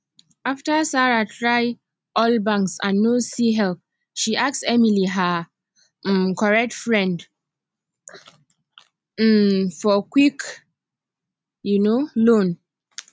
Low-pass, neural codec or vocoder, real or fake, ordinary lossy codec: none; none; real; none